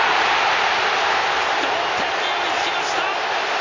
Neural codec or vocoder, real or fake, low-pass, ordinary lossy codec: none; real; 7.2 kHz; AAC, 32 kbps